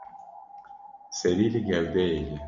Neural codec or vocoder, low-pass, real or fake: none; 7.2 kHz; real